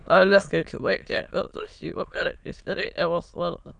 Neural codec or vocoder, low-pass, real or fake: autoencoder, 22.05 kHz, a latent of 192 numbers a frame, VITS, trained on many speakers; 9.9 kHz; fake